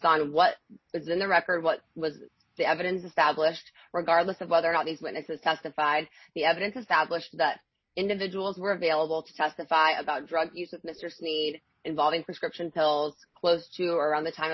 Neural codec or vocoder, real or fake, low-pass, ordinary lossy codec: none; real; 7.2 kHz; MP3, 24 kbps